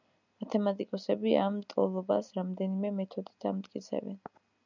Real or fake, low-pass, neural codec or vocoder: real; 7.2 kHz; none